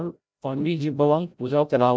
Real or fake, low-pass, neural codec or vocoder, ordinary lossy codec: fake; none; codec, 16 kHz, 0.5 kbps, FreqCodec, larger model; none